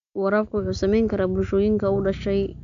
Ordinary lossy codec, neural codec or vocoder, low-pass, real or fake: none; none; 7.2 kHz; real